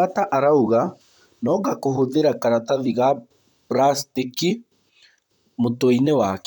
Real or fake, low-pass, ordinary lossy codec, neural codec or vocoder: fake; 19.8 kHz; none; vocoder, 44.1 kHz, 128 mel bands, Pupu-Vocoder